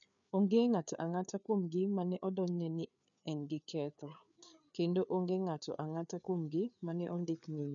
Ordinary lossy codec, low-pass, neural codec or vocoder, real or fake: none; 7.2 kHz; codec, 16 kHz, 4 kbps, FreqCodec, larger model; fake